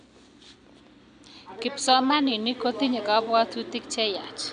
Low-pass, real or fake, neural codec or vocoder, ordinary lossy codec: 9.9 kHz; real; none; none